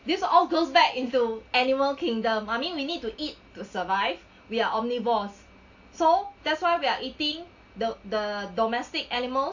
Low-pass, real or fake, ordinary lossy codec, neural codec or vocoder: 7.2 kHz; real; AAC, 48 kbps; none